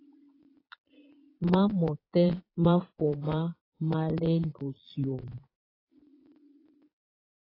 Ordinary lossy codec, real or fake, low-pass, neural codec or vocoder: AAC, 24 kbps; fake; 5.4 kHz; vocoder, 22.05 kHz, 80 mel bands, Vocos